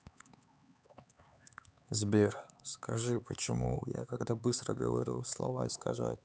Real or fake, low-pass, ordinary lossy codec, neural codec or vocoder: fake; none; none; codec, 16 kHz, 4 kbps, X-Codec, HuBERT features, trained on LibriSpeech